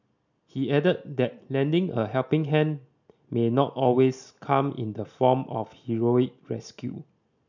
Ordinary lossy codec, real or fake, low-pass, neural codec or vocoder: none; real; 7.2 kHz; none